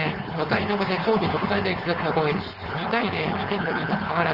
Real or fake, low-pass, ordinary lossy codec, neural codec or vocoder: fake; 5.4 kHz; Opus, 24 kbps; codec, 16 kHz, 4.8 kbps, FACodec